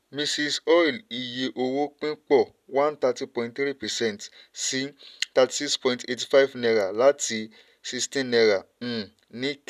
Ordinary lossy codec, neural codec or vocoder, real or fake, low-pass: none; none; real; 14.4 kHz